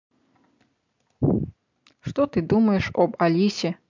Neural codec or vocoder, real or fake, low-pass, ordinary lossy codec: none; real; 7.2 kHz; MP3, 64 kbps